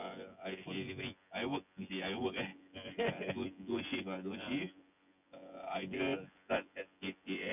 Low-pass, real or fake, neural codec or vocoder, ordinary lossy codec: 3.6 kHz; fake; vocoder, 24 kHz, 100 mel bands, Vocos; none